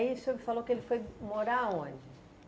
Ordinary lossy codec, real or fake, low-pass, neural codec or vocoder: none; real; none; none